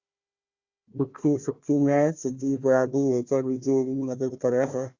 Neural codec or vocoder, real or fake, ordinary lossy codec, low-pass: codec, 16 kHz, 1 kbps, FunCodec, trained on Chinese and English, 50 frames a second; fake; Opus, 64 kbps; 7.2 kHz